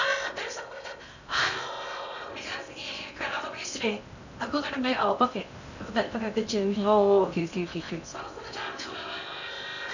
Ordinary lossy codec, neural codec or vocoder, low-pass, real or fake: none; codec, 16 kHz in and 24 kHz out, 0.6 kbps, FocalCodec, streaming, 2048 codes; 7.2 kHz; fake